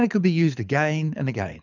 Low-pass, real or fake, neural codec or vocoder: 7.2 kHz; fake; codec, 24 kHz, 6 kbps, HILCodec